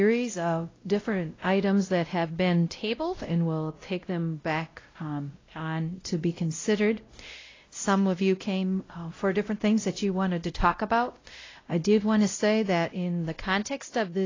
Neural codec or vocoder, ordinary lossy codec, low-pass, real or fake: codec, 16 kHz, 0.5 kbps, X-Codec, WavLM features, trained on Multilingual LibriSpeech; AAC, 32 kbps; 7.2 kHz; fake